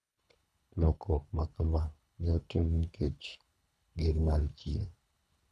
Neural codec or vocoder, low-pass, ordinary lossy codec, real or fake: codec, 24 kHz, 3 kbps, HILCodec; none; none; fake